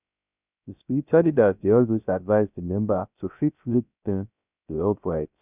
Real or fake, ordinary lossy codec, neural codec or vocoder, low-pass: fake; none; codec, 16 kHz, 0.3 kbps, FocalCodec; 3.6 kHz